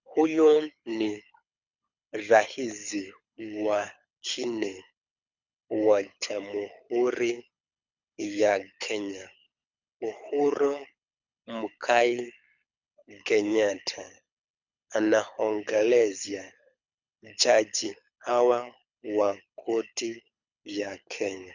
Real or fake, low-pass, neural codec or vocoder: fake; 7.2 kHz; codec, 24 kHz, 6 kbps, HILCodec